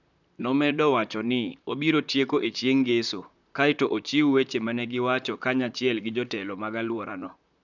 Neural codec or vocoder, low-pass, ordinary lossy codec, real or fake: vocoder, 44.1 kHz, 128 mel bands, Pupu-Vocoder; 7.2 kHz; none; fake